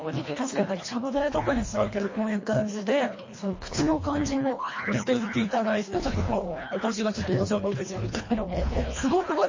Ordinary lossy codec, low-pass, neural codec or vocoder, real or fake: MP3, 32 kbps; 7.2 kHz; codec, 24 kHz, 1.5 kbps, HILCodec; fake